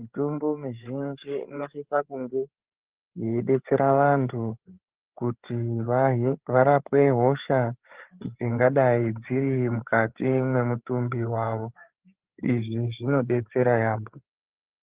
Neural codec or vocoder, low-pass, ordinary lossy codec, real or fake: codec, 16 kHz, 8 kbps, FreqCodec, larger model; 3.6 kHz; Opus, 16 kbps; fake